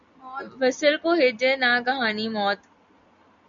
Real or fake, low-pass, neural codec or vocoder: real; 7.2 kHz; none